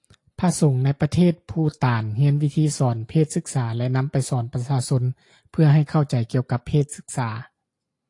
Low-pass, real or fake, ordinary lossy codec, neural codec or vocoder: 10.8 kHz; real; AAC, 48 kbps; none